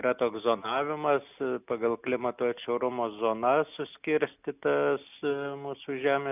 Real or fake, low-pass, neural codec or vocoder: real; 3.6 kHz; none